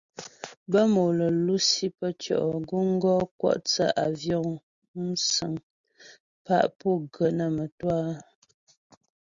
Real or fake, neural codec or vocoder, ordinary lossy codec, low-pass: real; none; Opus, 64 kbps; 7.2 kHz